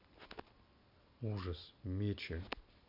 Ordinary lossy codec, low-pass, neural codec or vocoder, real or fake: none; 5.4 kHz; none; real